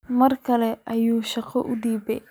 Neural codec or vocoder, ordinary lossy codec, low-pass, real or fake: none; none; none; real